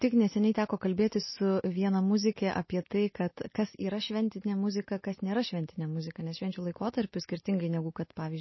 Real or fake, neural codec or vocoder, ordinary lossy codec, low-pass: real; none; MP3, 24 kbps; 7.2 kHz